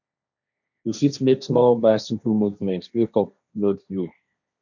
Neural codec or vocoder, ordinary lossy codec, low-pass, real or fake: codec, 16 kHz, 1.1 kbps, Voila-Tokenizer; none; 7.2 kHz; fake